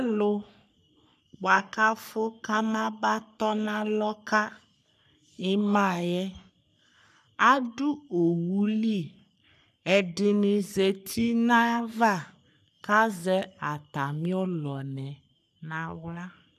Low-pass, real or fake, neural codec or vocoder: 14.4 kHz; fake; codec, 44.1 kHz, 3.4 kbps, Pupu-Codec